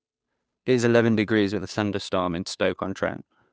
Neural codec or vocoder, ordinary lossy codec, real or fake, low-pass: codec, 16 kHz, 2 kbps, FunCodec, trained on Chinese and English, 25 frames a second; none; fake; none